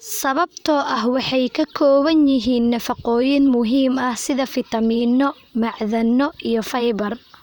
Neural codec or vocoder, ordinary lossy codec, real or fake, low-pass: vocoder, 44.1 kHz, 128 mel bands, Pupu-Vocoder; none; fake; none